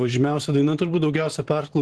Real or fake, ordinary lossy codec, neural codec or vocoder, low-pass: fake; Opus, 16 kbps; vocoder, 44.1 kHz, 128 mel bands every 512 samples, BigVGAN v2; 10.8 kHz